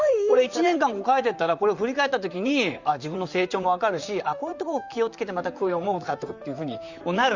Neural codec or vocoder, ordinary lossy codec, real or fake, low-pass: vocoder, 44.1 kHz, 128 mel bands, Pupu-Vocoder; Opus, 64 kbps; fake; 7.2 kHz